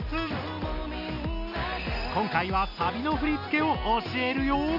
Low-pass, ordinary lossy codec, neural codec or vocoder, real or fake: 5.4 kHz; none; none; real